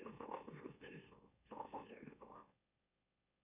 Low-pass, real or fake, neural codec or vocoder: 3.6 kHz; fake; autoencoder, 44.1 kHz, a latent of 192 numbers a frame, MeloTTS